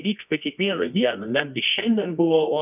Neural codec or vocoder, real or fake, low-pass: codec, 24 kHz, 0.9 kbps, WavTokenizer, medium music audio release; fake; 3.6 kHz